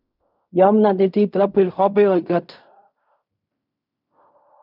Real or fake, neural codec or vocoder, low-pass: fake; codec, 16 kHz in and 24 kHz out, 0.4 kbps, LongCat-Audio-Codec, fine tuned four codebook decoder; 5.4 kHz